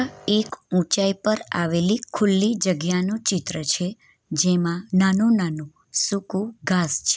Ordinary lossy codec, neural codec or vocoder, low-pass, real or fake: none; none; none; real